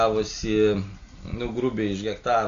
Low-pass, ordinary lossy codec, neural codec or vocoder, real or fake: 7.2 kHz; AAC, 48 kbps; none; real